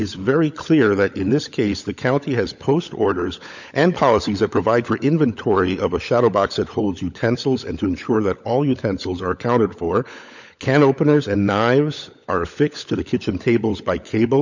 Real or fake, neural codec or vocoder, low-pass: fake; codec, 16 kHz, 16 kbps, FunCodec, trained on LibriTTS, 50 frames a second; 7.2 kHz